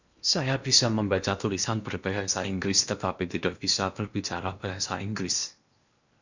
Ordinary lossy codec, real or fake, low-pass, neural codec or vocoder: Opus, 64 kbps; fake; 7.2 kHz; codec, 16 kHz in and 24 kHz out, 0.8 kbps, FocalCodec, streaming, 65536 codes